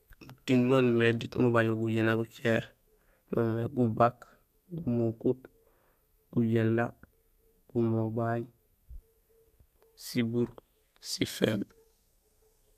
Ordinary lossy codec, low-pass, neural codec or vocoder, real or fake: none; 14.4 kHz; codec, 32 kHz, 1.9 kbps, SNAC; fake